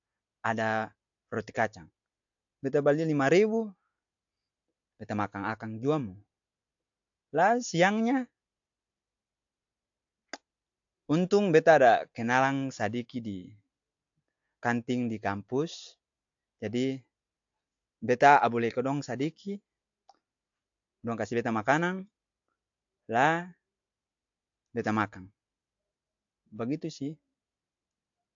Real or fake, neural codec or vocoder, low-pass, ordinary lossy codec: real; none; 7.2 kHz; AAC, 64 kbps